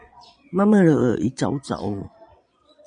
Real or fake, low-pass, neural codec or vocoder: fake; 9.9 kHz; vocoder, 22.05 kHz, 80 mel bands, Vocos